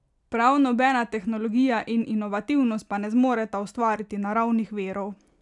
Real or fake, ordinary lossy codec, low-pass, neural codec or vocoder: real; none; 10.8 kHz; none